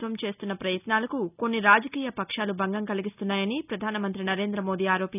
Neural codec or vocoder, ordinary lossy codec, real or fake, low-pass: none; none; real; 3.6 kHz